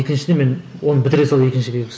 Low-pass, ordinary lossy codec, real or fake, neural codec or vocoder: none; none; real; none